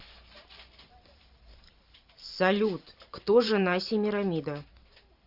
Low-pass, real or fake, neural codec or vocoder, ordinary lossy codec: 5.4 kHz; fake; vocoder, 44.1 kHz, 128 mel bands every 512 samples, BigVGAN v2; Opus, 64 kbps